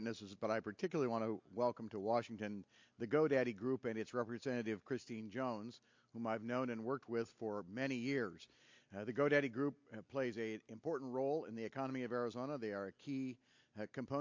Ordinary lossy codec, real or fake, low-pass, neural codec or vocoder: MP3, 48 kbps; real; 7.2 kHz; none